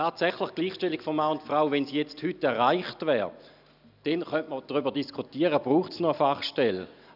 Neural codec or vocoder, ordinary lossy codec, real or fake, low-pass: none; none; real; 5.4 kHz